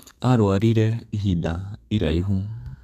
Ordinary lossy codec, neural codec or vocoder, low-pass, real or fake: none; codec, 32 kHz, 1.9 kbps, SNAC; 14.4 kHz; fake